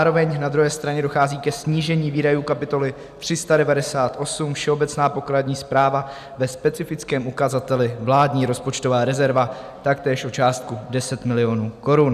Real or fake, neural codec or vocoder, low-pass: real; none; 14.4 kHz